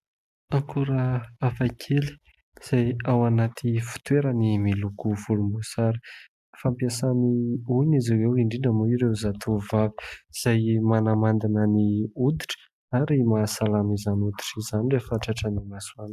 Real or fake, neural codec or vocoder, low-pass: real; none; 14.4 kHz